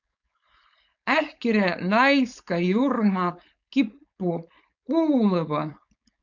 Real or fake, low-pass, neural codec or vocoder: fake; 7.2 kHz; codec, 16 kHz, 4.8 kbps, FACodec